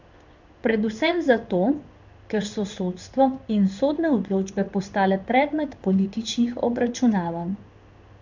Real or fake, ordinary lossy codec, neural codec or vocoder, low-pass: fake; none; codec, 16 kHz in and 24 kHz out, 1 kbps, XY-Tokenizer; 7.2 kHz